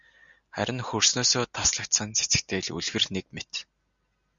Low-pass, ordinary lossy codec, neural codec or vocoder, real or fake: 7.2 kHz; Opus, 64 kbps; none; real